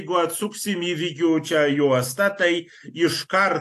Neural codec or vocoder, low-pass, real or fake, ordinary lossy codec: none; 14.4 kHz; real; AAC, 64 kbps